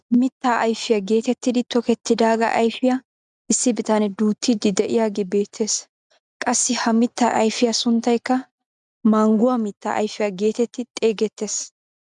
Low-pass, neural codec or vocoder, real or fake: 10.8 kHz; none; real